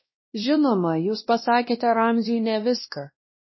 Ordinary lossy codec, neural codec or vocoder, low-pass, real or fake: MP3, 24 kbps; codec, 16 kHz, 1 kbps, X-Codec, WavLM features, trained on Multilingual LibriSpeech; 7.2 kHz; fake